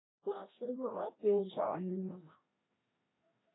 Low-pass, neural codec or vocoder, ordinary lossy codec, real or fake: 7.2 kHz; codec, 16 kHz, 1 kbps, FreqCodec, larger model; AAC, 16 kbps; fake